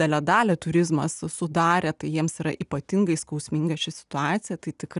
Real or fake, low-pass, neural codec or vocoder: real; 10.8 kHz; none